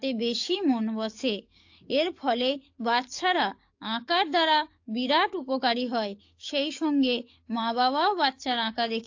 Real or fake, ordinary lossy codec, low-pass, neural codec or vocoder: fake; AAC, 48 kbps; 7.2 kHz; codec, 44.1 kHz, 7.8 kbps, DAC